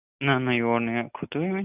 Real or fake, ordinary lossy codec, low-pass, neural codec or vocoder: real; none; 3.6 kHz; none